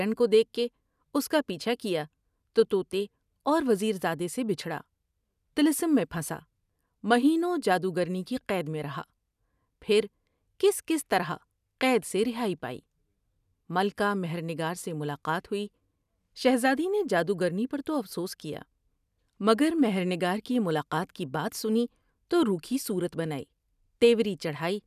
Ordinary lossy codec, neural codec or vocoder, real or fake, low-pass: none; none; real; 14.4 kHz